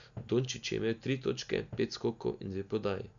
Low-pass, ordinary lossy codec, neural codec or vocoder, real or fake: 7.2 kHz; none; none; real